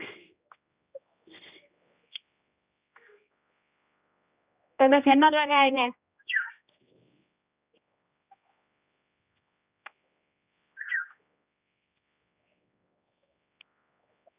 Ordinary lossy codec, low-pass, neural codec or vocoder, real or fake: Opus, 64 kbps; 3.6 kHz; codec, 16 kHz, 1 kbps, X-Codec, HuBERT features, trained on general audio; fake